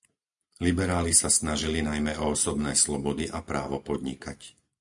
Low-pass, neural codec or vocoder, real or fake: 10.8 kHz; none; real